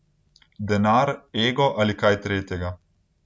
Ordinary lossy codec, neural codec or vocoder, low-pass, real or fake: none; none; none; real